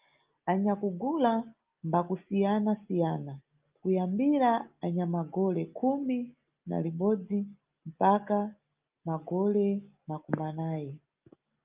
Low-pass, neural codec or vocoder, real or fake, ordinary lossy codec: 3.6 kHz; none; real; Opus, 24 kbps